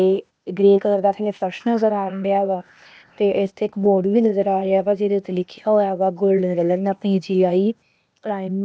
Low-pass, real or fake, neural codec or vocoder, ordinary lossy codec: none; fake; codec, 16 kHz, 0.8 kbps, ZipCodec; none